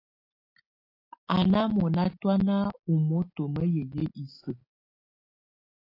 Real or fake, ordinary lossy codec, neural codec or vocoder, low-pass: real; AAC, 32 kbps; none; 5.4 kHz